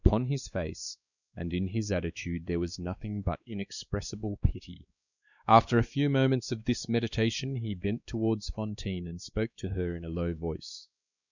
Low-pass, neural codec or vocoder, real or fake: 7.2 kHz; codec, 16 kHz, 2 kbps, X-Codec, WavLM features, trained on Multilingual LibriSpeech; fake